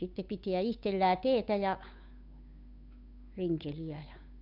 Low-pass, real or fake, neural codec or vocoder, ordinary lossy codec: 5.4 kHz; fake; codec, 16 kHz, 8 kbps, FunCodec, trained on Chinese and English, 25 frames a second; none